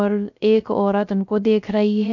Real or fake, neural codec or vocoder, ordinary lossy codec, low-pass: fake; codec, 16 kHz, 0.3 kbps, FocalCodec; none; 7.2 kHz